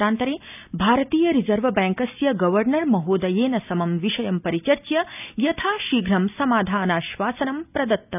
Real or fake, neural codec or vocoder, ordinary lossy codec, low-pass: real; none; none; 3.6 kHz